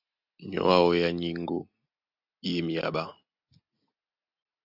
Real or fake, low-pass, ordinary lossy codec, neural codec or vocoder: real; 5.4 kHz; AAC, 48 kbps; none